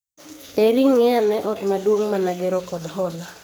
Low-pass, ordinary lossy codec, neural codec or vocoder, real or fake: none; none; codec, 44.1 kHz, 2.6 kbps, SNAC; fake